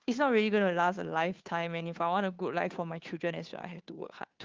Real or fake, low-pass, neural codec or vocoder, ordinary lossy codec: fake; 7.2 kHz; codec, 16 kHz, 2 kbps, FunCodec, trained on Chinese and English, 25 frames a second; Opus, 32 kbps